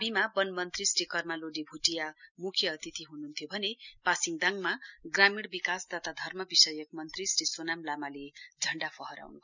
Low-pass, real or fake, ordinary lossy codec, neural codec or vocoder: 7.2 kHz; real; none; none